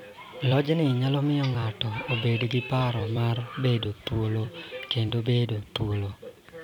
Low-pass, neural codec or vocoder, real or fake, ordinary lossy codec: 19.8 kHz; vocoder, 44.1 kHz, 128 mel bands every 256 samples, BigVGAN v2; fake; none